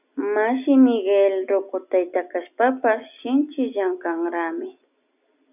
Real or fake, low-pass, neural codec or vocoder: real; 3.6 kHz; none